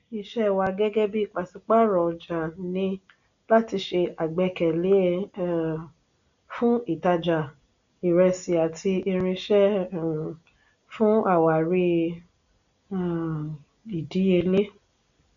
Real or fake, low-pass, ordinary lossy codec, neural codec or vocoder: real; 7.2 kHz; none; none